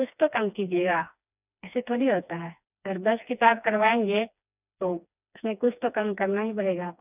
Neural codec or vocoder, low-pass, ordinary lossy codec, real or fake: codec, 16 kHz, 2 kbps, FreqCodec, smaller model; 3.6 kHz; none; fake